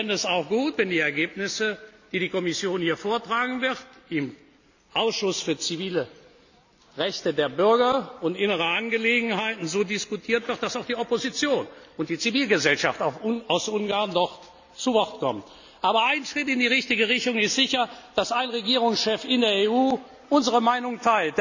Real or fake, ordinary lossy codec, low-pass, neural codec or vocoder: real; none; 7.2 kHz; none